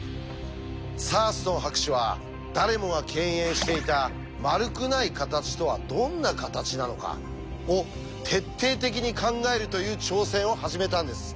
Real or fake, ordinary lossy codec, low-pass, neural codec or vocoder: real; none; none; none